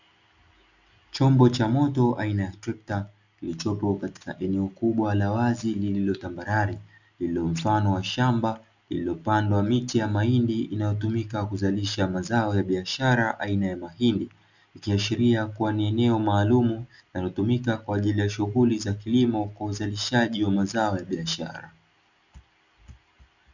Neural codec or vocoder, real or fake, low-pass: none; real; 7.2 kHz